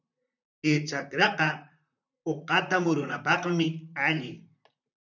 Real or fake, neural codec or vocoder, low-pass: fake; vocoder, 44.1 kHz, 128 mel bands, Pupu-Vocoder; 7.2 kHz